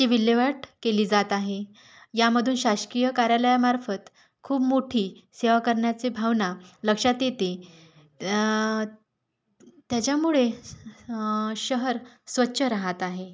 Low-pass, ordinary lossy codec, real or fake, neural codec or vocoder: none; none; real; none